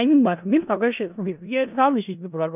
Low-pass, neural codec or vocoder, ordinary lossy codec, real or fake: 3.6 kHz; codec, 16 kHz in and 24 kHz out, 0.4 kbps, LongCat-Audio-Codec, four codebook decoder; none; fake